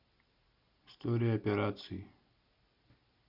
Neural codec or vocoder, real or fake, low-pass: none; real; 5.4 kHz